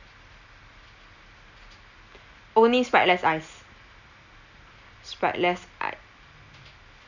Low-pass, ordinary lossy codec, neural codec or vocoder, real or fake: 7.2 kHz; none; none; real